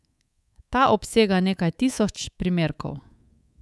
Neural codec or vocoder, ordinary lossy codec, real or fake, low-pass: codec, 24 kHz, 3.1 kbps, DualCodec; none; fake; none